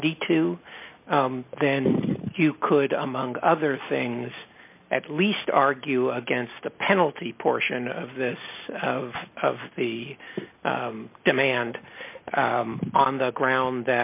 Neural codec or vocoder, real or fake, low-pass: none; real; 3.6 kHz